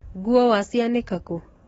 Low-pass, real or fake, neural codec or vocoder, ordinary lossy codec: 19.8 kHz; fake; codec, 44.1 kHz, 7.8 kbps, DAC; AAC, 24 kbps